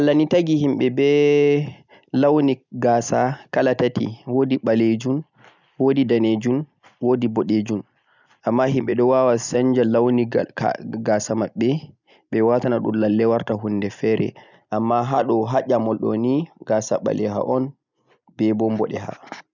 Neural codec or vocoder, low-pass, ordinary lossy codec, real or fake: none; 7.2 kHz; none; real